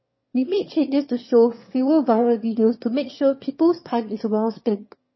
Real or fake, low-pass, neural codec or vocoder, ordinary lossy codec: fake; 7.2 kHz; autoencoder, 22.05 kHz, a latent of 192 numbers a frame, VITS, trained on one speaker; MP3, 24 kbps